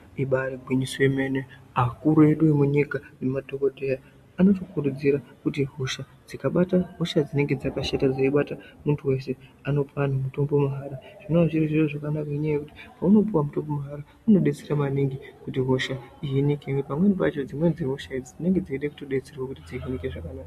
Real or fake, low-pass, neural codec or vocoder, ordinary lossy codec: real; 14.4 kHz; none; MP3, 64 kbps